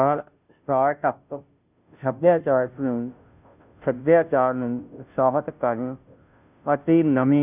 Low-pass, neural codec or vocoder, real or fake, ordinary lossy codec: 3.6 kHz; codec, 16 kHz, 0.5 kbps, FunCodec, trained on Chinese and English, 25 frames a second; fake; none